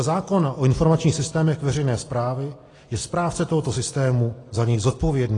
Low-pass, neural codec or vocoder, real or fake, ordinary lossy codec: 10.8 kHz; none; real; AAC, 32 kbps